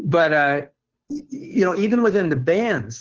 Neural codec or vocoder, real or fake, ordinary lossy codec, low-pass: codec, 16 kHz, 2 kbps, FunCodec, trained on Chinese and English, 25 frames a second; fake; Opus, 16 kbps; 7.2 kHz